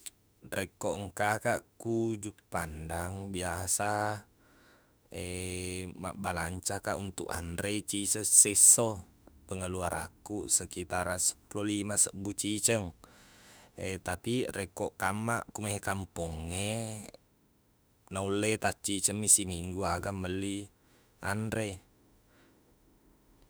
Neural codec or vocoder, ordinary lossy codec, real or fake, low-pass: autoencoder, 48 kHz, 32 numbers a frame, DAC-VAE, trained on Japanese speech; none; fake; none